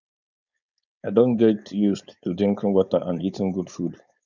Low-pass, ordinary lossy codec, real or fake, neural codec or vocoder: 7.2 kHz; none; fake; codec, 16 kHz, 4.8 kbps, FACodec